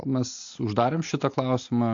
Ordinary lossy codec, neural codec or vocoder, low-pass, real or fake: AAC, 48 kbps; none; 7.2 kHz; real